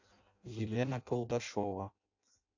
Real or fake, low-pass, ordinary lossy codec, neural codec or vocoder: fake; 7.2 kHz; AAC, 48 kbps; codec, 16 kHz in and 24 kHz out, 0.6 kbps, FireRedTTS-2 codec